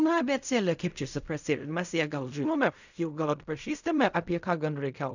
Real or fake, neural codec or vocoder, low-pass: fake; codec, 16 kHz in and 24 kHz out, 0.4 kbps, LongCat-Audio-Codec, fine tuned four codebook decoder; 7.2 kHz